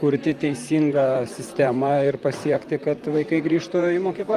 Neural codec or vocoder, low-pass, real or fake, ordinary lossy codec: vocoder, 44.1 kHz, 128 mel bands, Pupu-Vocoder; 14.4 kHz; fake; Opus, 32 kbps